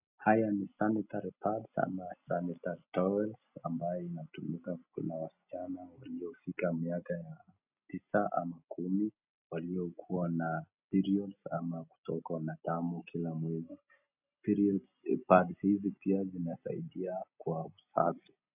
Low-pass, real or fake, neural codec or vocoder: 3.6 kHz; real; none